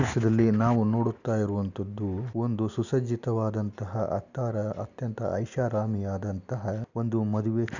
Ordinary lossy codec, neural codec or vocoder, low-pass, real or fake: none; none; 7.2 kHz; real